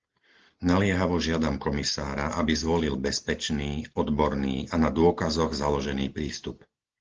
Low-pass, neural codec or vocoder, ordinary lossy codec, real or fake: 7.2 kHz; none; Opus, 16 kbps; real